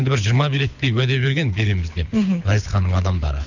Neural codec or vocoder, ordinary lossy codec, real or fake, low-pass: codec, 24 kHz, 6 kbps, HILCodec; none; fake; 7.2 kHz